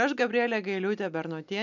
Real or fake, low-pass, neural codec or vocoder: real; 7.2 kHz; none